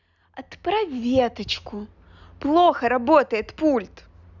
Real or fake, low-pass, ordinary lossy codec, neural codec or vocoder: real; 7.2 kHz; none; none